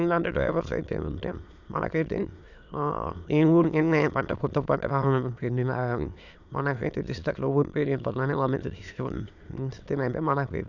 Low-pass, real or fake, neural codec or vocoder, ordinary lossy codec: 7.2 kHz; fake; autoencoder, 22.05 kHz, a latent of 192 numbers a frame, VITS, trained on many speakers; none